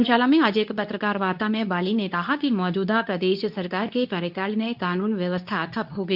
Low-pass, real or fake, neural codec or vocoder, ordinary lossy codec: 5.4 kHz; fake; codec, 24 kHz, 0.9 kbps, WavTokenizer, medium speech release version 2; none